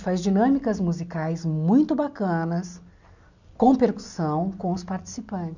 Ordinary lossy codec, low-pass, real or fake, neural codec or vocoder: none; 7.2 kHz; real; none